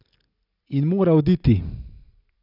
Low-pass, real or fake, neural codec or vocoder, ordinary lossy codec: 5.4 kHz; real; none; none